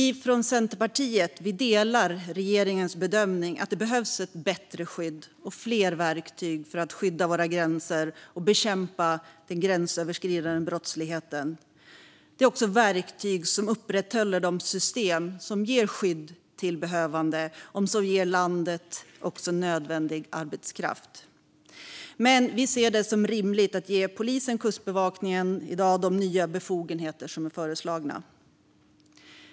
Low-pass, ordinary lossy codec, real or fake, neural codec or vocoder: none; none; real; none